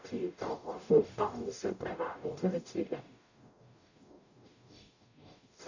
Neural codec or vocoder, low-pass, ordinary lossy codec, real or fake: codec, 44.1 kHz, 0.9 kbps, DAC; 7.2 kHz; none; fake